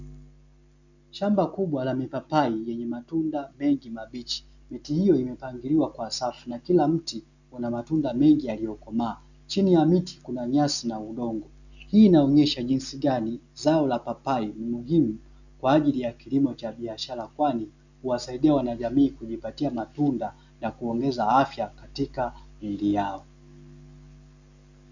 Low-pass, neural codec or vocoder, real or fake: 7.2 kHz; none; real